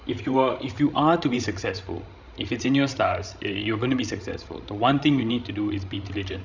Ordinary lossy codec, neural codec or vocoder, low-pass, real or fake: none; codec, 16 kHz, 16 kbps, FreqCodec, larger model; 7.2 kHz; fake